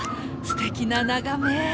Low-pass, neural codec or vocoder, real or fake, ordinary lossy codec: none; none; real; none